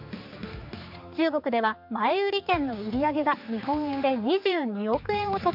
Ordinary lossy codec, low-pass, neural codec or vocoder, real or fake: MP3, 48 kbps; 5.4 kHz; codec, 16 kHz, 4 kbps, X-Codec, HuBERT features, trained on general audio; fake